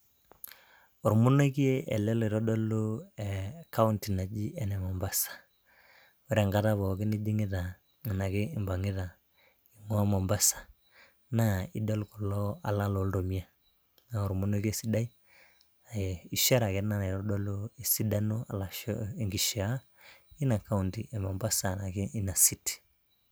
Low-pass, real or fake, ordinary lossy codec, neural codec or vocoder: none; real; none; none